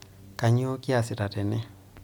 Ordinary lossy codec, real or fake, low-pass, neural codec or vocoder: MP3, 96 kbps; real; 19.8 kHz; none